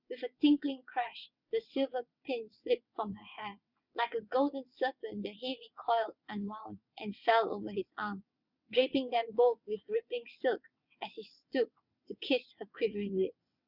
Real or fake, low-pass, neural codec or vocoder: fake; 5.4 kHz; vocoder, 22.05 kHz, 80 mel bands, WaveNeXt